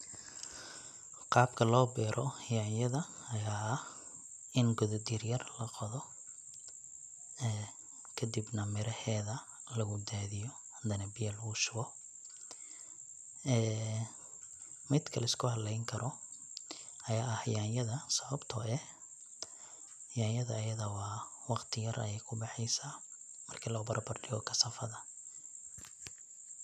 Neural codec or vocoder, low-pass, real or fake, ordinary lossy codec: none; 14.4 kHz; real; none